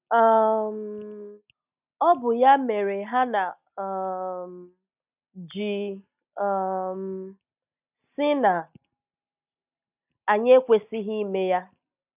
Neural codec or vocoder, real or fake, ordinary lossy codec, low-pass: none; real; none; 3.6 kHz